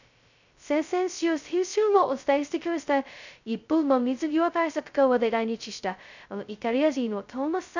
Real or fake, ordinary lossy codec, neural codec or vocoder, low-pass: fake; none; codec, 16 kHz, 0.2 kbps, FocalCodec; 7.2 kHz